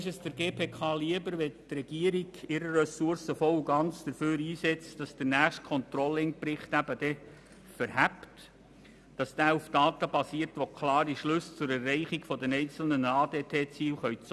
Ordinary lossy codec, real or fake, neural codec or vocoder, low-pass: none; real; none; none